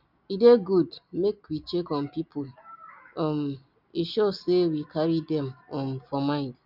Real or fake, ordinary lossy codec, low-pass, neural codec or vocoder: real; none; 5.4 kHz; none